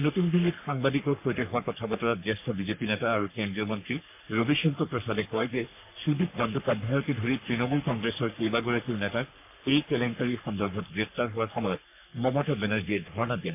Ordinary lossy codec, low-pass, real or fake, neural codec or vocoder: MP3, 32 kbps; 3.6 kHz; fake; codec, 44.1 kHz, 3.4 kbps, Pupu-Codec